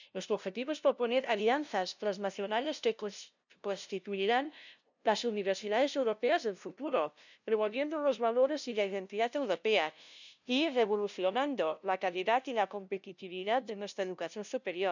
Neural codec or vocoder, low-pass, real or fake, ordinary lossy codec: codec, 16 kHz, 0.5 kbps, FunCodec, trained on LibriTTS, 25 frames a second; 7.2 kHz; fake; none